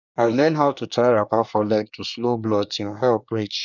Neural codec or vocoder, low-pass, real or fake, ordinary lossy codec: codec, 24 kHz, 1 kbps, SNAC; 7.2 kHz; fake; none